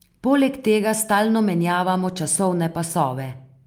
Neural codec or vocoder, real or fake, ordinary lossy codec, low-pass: none; real; Opus, 32 kbps; 19.8 kHz